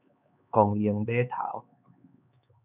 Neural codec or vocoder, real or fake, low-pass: codec, 16 kHz, 4 kbps, X-Codec, HuBERT features, trained on LibriSpeech; fake; 3.6 kHz